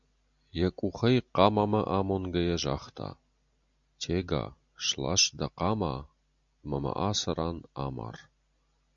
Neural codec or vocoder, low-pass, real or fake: none; 7.2 kHz; real